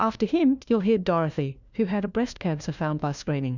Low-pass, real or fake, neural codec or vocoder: 7.2 kHz; fake; codec, 16 kHz, 1 kbps, FunCodec, trained on LibriTTS, 50 frames a second